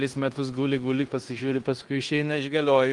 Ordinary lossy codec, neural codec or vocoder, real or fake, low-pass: Opus, 24 kbps; codec, 16 kHz in and 24 kHz out, 0.9 kbps, LongCat-Audio-Codec, fine tuned four codebook decoder; fake; 10.8 kHz